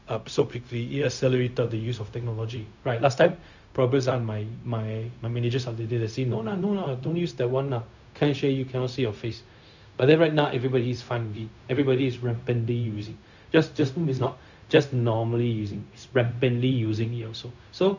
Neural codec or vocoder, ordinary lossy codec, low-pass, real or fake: codec, 16 kHz, 0.4 kbps, LongCat-Audio-Codec; none; 7.2 kHz; fake